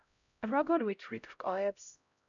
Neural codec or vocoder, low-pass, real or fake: codec, 16 kHz, 0.5 kbps, X-Codec, HuBERT features, trained on LibriSpeech; 7.2 kHz; fake